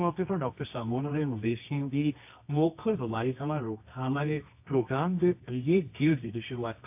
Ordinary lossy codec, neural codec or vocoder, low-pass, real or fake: AAC, 32 kbps; codec, 24 kHz, 0.9 kbps, WavTokenizer, medium music audio release; 3.6 kHz; fake